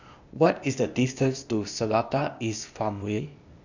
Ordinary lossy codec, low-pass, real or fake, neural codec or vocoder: none; 7.2 kHz; fake; codec, 16 kHz, 0.8 kbps, ZipCodec